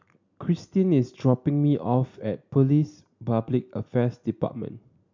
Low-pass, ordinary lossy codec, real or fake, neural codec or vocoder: 7.2 kHz; MP3, 64 kbps; real; none